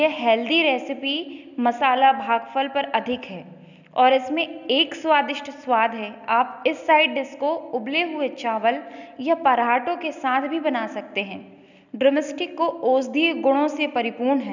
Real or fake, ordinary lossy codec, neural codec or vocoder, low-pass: real; none; none; 7.2 kHz